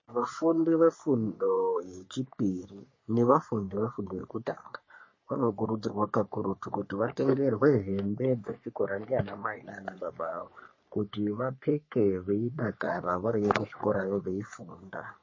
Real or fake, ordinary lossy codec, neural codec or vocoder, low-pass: fake; MP3, 32 kbps; codec, 44.1 kHz, 3.4 kbps, Pupu-Codec; 7.2 kHz